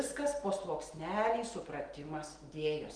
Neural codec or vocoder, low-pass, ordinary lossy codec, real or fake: none; 9.9 kHz; Opus, 24 kbps; real